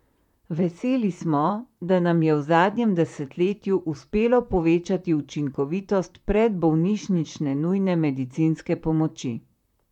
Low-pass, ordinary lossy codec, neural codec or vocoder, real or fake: 19.8 kHz; MP3, 96 kbps; vocoder, 44.1 kHz, 128 mel bands, Pupu-Vocoder; fake